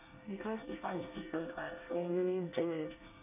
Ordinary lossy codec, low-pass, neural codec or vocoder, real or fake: none; 3.6 kHz; codec, 24 kHz, 1 kbps, SNAC; fake